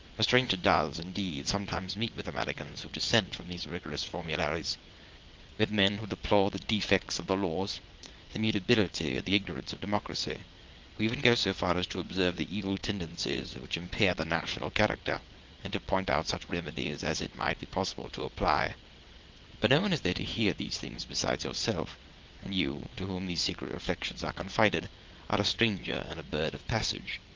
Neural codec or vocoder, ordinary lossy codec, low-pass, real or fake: vocoder, 22.05 kHz, 80 mel bands, WaveNeXt; Opus, 32 kbps; 7.2 kHz; fake